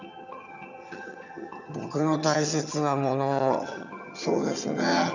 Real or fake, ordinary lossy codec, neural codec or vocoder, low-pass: fake; none; vocoder, 22.05 kHz, 80 mel bands, HiFi-GAN; 7.2 kHz